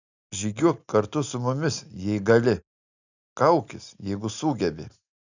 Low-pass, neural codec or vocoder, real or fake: 7.2 kHz; none; real